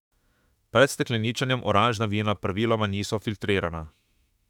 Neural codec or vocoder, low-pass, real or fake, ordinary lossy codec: autoencoder, 48 kHz, 32 numbers a frame, DAC-VAE, trained on Japanese speech; 19.8 kHz; fake; none